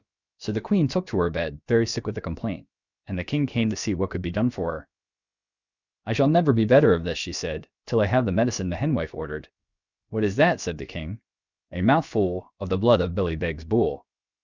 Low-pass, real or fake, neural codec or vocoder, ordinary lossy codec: 7.2 kHz; fake; codec, 16 kHz, about 1 kbps, DyCAST, with the encoder's durations; Opus, 64 kbps